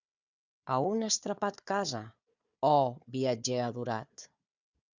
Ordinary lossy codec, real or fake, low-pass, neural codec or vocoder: Opus, 64 kbps; fake; 7.2 kHz; codec, 16 kHz, 4 kbps, FunCodec, trained on Chinese and English, 50 frames a second